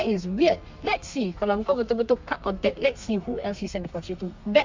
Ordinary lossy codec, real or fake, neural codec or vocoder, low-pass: MP3, 64 kbps; fake; codec, 32 kHz, 1.9 kbps, SNAC; 7.2 kHz